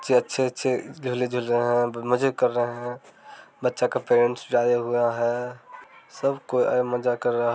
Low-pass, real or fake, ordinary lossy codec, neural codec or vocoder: none; real; none; none